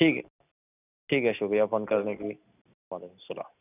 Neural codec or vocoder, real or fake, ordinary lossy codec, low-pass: none; real; none; 3.6 kHz